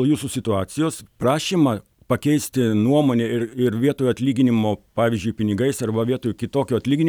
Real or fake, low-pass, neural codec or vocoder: real; 19.8 kHz; none